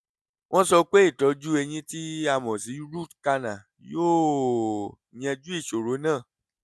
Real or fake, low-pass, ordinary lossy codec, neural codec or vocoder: real; none; none; none